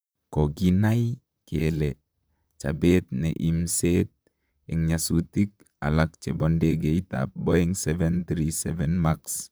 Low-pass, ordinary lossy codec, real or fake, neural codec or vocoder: none; none; fake; vocoder, 44.1 kHz, 128 mel bands, Pupu-Vocoder